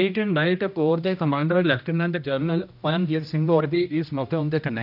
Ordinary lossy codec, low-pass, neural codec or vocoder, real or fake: none; 5.4 kHz; codec, 16 kHz, 1 kbps, X-Codec, HuBERT features, trained on general audio; fake